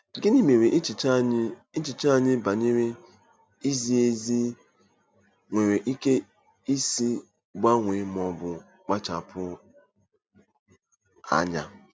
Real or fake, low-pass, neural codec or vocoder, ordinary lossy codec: real; none; none; none